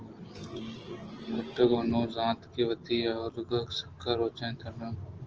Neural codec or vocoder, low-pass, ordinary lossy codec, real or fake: none; 7.2 kHz; Opus, 16 kbps; real